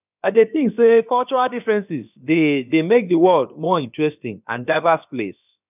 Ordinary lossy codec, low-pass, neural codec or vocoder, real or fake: none; 3.6 kHz; codec, 16 kHz, about 1 kbps, DyCAST, with the encoder's durations; fake